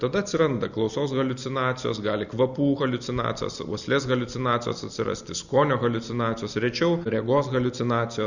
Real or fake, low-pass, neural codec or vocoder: real; 7.2 kHz; none